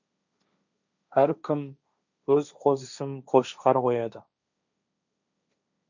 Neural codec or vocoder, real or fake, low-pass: codec, 16 kHz, 1.1 kbps, Voila-Tokenizer; fake; 7.2 kHz